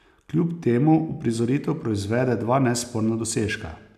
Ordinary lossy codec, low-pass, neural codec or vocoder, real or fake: none; 14.4 kHz; none; real